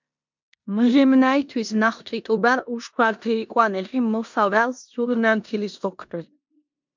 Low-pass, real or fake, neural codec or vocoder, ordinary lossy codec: 7.2 kHz; fake; codec, 16 kHz in and 24 kHz out, 0.9 kbps, LongCat-Audio-Codec, four codebook decoder; MP3, 64 kbps